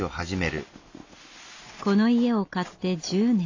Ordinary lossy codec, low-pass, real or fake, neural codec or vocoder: AAC, 48 kbps; 7.2 kHz; real; none